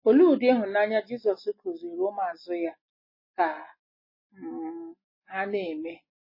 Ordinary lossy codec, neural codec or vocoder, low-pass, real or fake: MP3, 24 kbps; none; 5.4 kHz; real